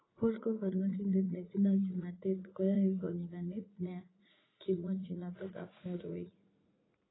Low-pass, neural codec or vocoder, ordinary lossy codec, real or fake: 7.2 kHz; vocoder, 44.1 kHz, 80 mel bands, Vocos; AAC, 16 kbps; fake